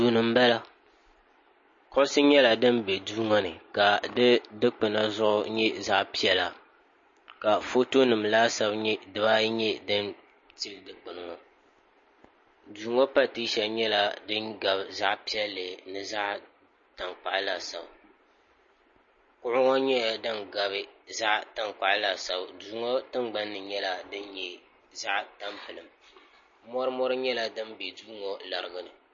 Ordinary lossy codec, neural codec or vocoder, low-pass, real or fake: MP3, 32 kbps; none; 7.2 kHz; real